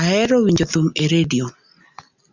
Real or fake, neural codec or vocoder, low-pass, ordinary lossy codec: real; none; 7.2 kHz; Opus, 64 kbps